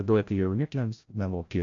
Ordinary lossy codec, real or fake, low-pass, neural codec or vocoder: AAC, 48 kbps; fake; 7.2 kHz; codec, 16 kHz, 0.5 kbps, FreqCodec, larger model